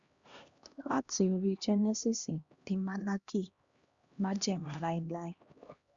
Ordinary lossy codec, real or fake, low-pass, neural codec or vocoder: Opus, 64 kbps; fake; 7.2 kHz; codec, 16 kHz, 1 kbps, X-Codec, HuBERT features, trained on LibriSpeech